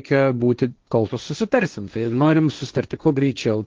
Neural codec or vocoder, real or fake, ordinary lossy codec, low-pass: codec, 16 kHz, 1.1 kbps, Voila-Tokenizer; fake; Opus, 24 kbps; 7.2 kHz